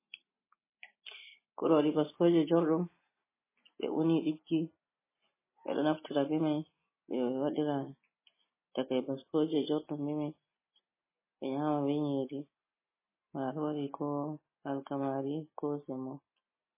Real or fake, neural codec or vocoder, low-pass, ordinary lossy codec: real; none; 3.6 kHz; MP3, 16 kbps